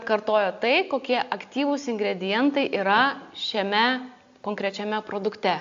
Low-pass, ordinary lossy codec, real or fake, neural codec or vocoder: 7.2 kHz; AAC, 64 kbps; real; none